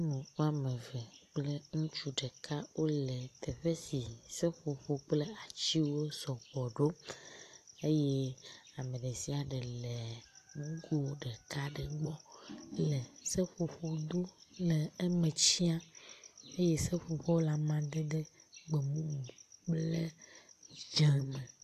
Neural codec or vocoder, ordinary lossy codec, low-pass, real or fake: none; AAC, 64 kbps; 14.4 kHz; real